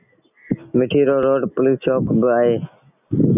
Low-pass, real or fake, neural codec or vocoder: 3.6 kHz; real; none